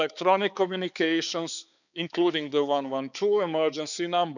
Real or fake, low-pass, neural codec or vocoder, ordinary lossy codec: fake; 7.2 kHz; codec, 16 kHz, 4 kbps, X-Codec, HuBERT features, trained on balanced general audio; none